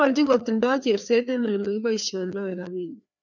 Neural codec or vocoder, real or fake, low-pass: codec, 16 kHz, 2 kbps, FreqCodec, larger model; fake; 7.2 kHz